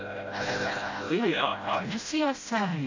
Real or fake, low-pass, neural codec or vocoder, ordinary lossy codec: fake; 7.2 kHz; codec, 16 kHz, 0.5 kbps, FreqCodec, smaller model; AAC, 48 kbps